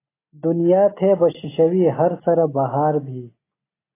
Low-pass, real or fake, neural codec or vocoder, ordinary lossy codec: 3.6 kHz; real; none; AAC, 16 kbps